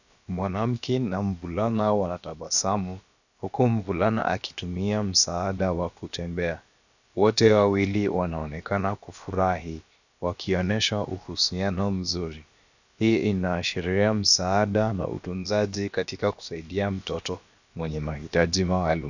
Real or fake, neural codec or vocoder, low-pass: fake; codec, 16 kHz, about 1 kbps, DyCAST, with the encoder's durations; 7.2 kHz